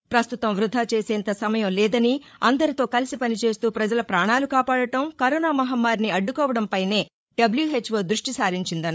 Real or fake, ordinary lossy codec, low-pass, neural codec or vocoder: fake; none; none; codec, 16 kHz, 8 kbps, FreqCodec, larger model